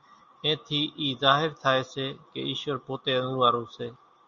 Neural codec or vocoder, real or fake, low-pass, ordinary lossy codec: none; real; 7.2 kHz; Opus, 64 kbps